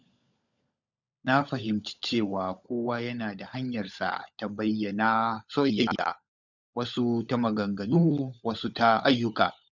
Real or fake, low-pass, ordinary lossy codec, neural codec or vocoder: fake; 7.2 kHz; none; codec, 16 kHz, 16 kbps, FunCodec, trained on LibriTTS, 50 frames a second